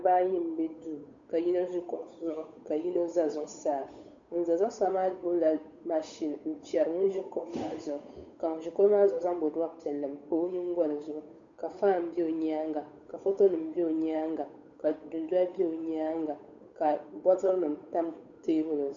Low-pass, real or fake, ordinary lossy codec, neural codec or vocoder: 7.2 kHz; fake; MP3, 64 kbps; codec, 16 kHz, 8 kbps, FunCodec, trained on Chinese and English, 25 frames a second